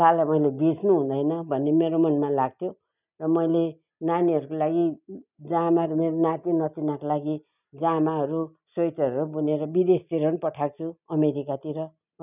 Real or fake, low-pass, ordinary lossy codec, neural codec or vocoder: real; 3.6 kHz; none; none